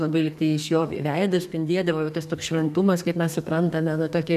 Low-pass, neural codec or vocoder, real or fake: 14.4 kHz; codec, 32 kHz, 1.9 kbps, SNAC; fake